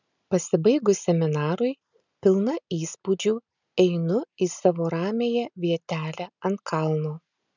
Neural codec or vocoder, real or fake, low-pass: none; real; 7.2 kHz